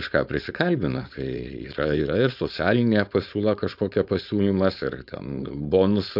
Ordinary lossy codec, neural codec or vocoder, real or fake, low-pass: AAC, 48 kbps; codec, 16 kHz, 4.8 kbps, FACodec; fake; 5.4 kHz